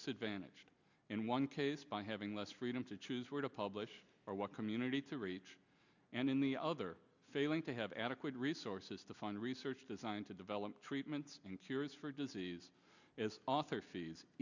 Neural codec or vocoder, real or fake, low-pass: none; real; 7.2 kHz